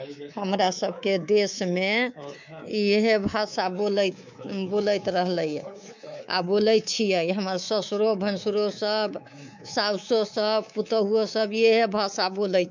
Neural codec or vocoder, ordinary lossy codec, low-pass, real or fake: codec, 24 kHz, 3.1 kbps, DualCodec; MP3, 64 kbps; 7.2 kHz; fake